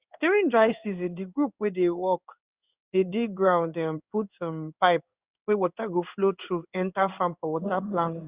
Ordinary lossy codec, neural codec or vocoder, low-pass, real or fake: Opus, 64 kbps; codec, 16 kHz in and 24 kHz out, 1 kbps, XY-Tokenizer; 3.6 kHz; fake